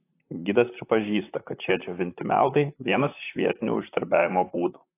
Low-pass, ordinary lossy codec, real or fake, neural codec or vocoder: 3.6 kHz; AAC, 24 kbps; fake; vocoder, 44.1 kHz, 128 mel bands, Pupu-Vocoder